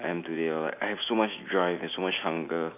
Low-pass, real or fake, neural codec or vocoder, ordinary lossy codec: 3.6 kHz; real; none; none